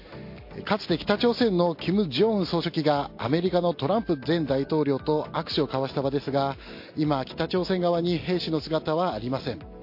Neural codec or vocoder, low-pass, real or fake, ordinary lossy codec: none; 5.4 kHz; real; MP3, 32 kbps